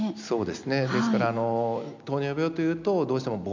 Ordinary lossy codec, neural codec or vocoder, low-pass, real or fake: MP3, 64 kbps; none; 7.2 kHz; real